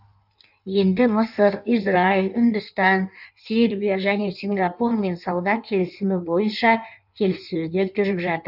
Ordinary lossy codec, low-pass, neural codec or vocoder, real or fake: none; 5.4 kHz; codec, 16 kHz in and 24 kHz out, 1.1 kbps, FireRedTTS-2 codec; fake